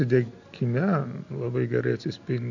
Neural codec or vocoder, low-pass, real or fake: none; 7.2 kHz; real